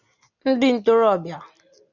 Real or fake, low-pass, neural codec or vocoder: real; 7.2 kHz; none